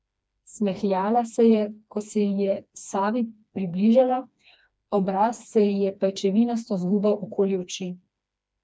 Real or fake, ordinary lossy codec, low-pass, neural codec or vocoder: fake; none; none; codec, 16 kHz, 2 kbps, FreqCodec, smaller model